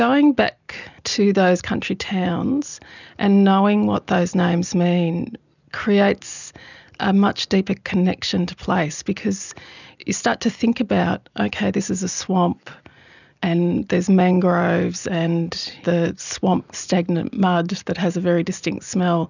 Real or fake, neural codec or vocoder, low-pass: real; none; 7.2 kHz